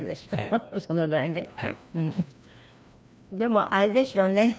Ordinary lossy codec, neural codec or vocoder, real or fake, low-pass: none; codec, 16 kHz, 1 kbps, FreqCodec, larger model; fake; none